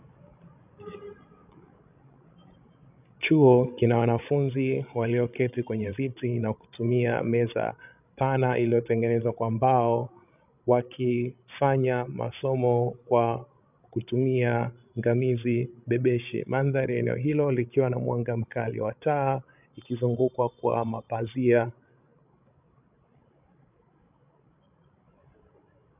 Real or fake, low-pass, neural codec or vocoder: fake; 3.6 kHz; codec, 16 kHz, 16 kbps, FreqCodec, larger model